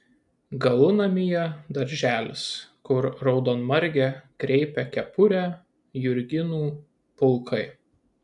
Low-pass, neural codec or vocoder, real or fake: 10.8 kHz; none; real